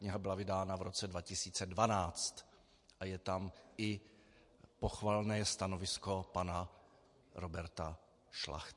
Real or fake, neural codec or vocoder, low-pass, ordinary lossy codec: real; none; 10.8 kHz; MP3, 48 kbps